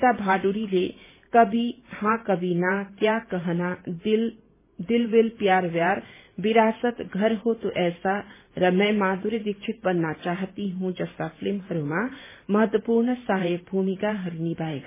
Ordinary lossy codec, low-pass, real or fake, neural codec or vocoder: MP3, 16 kbps; 3.6 kHz; fake; vocoder, 44.1 kHz, 128 mel bands every 512 samples, BigVGAN v2